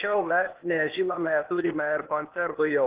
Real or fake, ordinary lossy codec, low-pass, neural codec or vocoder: fake; Opus, 16 kbps; 3.6 kHz; codec, 16 kHz, 0.8 kbps, ZipCodec